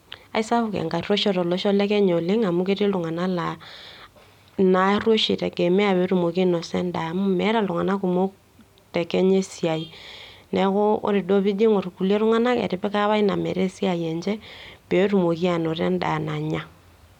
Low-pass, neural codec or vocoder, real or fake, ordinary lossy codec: 19.8 kHz; none; real; none